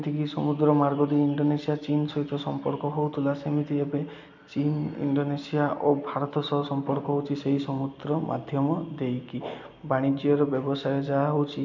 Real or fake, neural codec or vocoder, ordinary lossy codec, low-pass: real; none; MP3, 64 kbps; 7.2 kHz